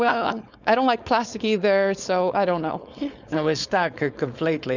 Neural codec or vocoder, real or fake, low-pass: codec, 16 kHz, 4.8 kbps, FACodec; fake; 7.2 kHz